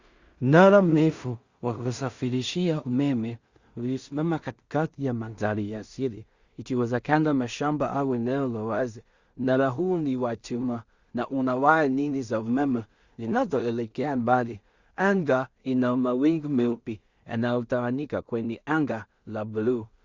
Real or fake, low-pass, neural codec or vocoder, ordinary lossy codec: fake; 7.2 kHz; codec, 16 kHz in and 24 kHz out, 0.4 kbps, LongCat-Audio-Codec, two codebook decoder; Opus, 64 kbps